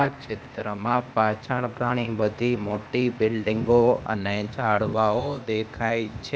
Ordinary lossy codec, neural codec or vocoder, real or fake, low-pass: none; codec, 16 kHz, 0.8 kbps, ZipCodec; fake; none